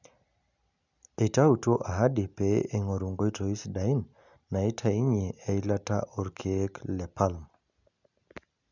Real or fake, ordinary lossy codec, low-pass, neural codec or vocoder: real; none; 7.2 kHz; none